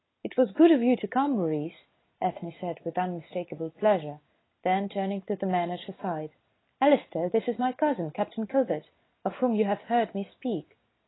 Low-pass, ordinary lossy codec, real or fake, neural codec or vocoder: 7.2 kHz; AAC, 16 kbps; real; none